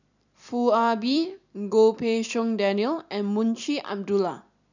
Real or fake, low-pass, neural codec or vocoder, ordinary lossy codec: fake; 7.2 kHz; vocoder, 44.1 kHz, 128 mel bands every 256 samples, BigVGAN v2; none